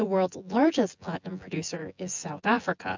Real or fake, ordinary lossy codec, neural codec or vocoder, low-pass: fake; MP3, 64 kbps; vocoder, 24 kHz, 100 mel bands, Vocos; 7.2 kHz